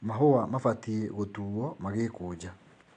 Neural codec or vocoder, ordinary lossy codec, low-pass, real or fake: none; AAC, 64 kbps; 9.9 kHz; real